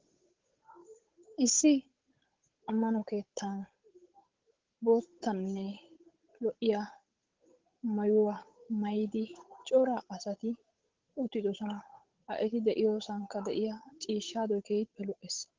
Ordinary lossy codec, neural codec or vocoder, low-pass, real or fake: Opus, 16 kbps; codec, 16 kHz, 8 kbps, FunCodec, trained on Chinese and English, 25 frames a second; 7.2 kHz; fake